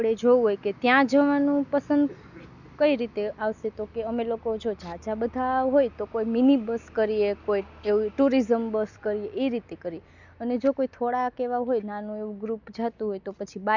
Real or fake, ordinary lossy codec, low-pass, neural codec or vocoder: real; none; 7.2 kHz; none